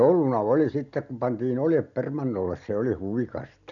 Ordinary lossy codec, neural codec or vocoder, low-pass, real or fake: none; none; 7.2 kHz; real